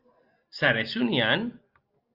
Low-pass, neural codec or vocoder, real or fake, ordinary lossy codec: 5.4 kHz; none; real; Opus, 24 kbps